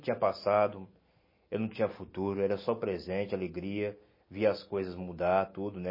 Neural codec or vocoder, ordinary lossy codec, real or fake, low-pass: none; MP3, 24 kbps; real; 5.4 kHz